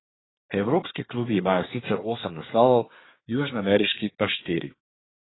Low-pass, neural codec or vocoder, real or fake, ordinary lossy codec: 7.2 kHz; codec, 24 kHz, 1 kbps, SNAC; fake; AAC, 16 kbps